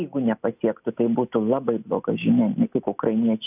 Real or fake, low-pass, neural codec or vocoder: real; 3.6 kHz; none